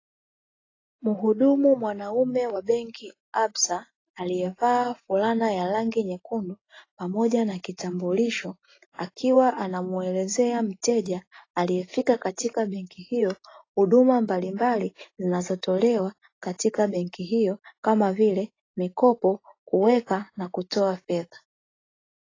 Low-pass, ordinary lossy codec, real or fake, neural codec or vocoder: 7.2 kHz; AAC, 32 kbps; real; none